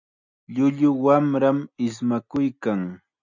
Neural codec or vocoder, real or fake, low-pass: none; real; 7.2 kHz